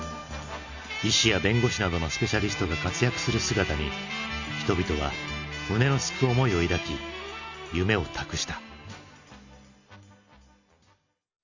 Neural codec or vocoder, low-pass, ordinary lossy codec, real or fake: none; 7.2 kHz; none; real